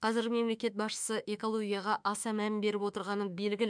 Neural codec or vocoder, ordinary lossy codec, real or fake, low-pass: autoencoder, 48 kHz, 32 numbers a frame, DAC-VAE, trained on Japanese speech; MP3, 96 kbps; fake; 9.9 kHz